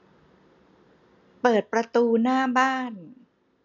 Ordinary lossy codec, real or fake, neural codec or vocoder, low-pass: none; real; none; 7.2 kHz